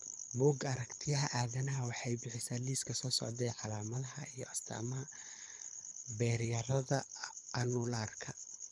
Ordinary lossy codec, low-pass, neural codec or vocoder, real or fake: none; none; codec, 24 kHz, 6 kbps, HILCodec; fake